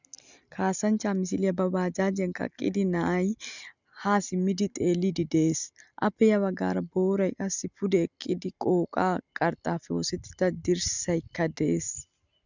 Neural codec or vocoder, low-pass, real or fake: none; 7.2 kHz; real